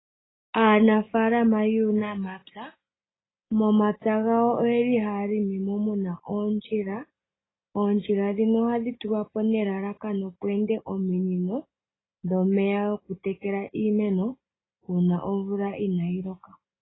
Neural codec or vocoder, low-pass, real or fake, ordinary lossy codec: none; 7.2 kHz; real; AAC, 16 kbps